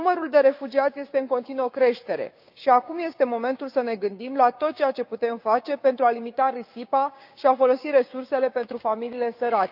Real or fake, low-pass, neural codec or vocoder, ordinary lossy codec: fake; 5.4 kHz; codec, 16 kHz, 6 kbps, DAC; none